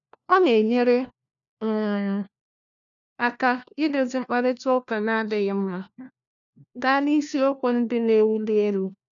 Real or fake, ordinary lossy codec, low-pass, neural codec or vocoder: fake; none; 7.2 kHz; codec, 16 kHz, 1 kbps, FunCodec, trained on LibriTTS, 50 frames a second